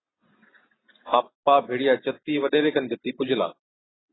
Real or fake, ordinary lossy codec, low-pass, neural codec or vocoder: real; AAC, 16 kbps; 7.2 kHz; none